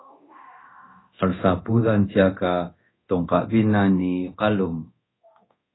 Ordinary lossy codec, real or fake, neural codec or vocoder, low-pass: AAC, 16 kbps; fake; codec, 24 kHz, 0.9 kbps, DualCodec; 7.2 kHz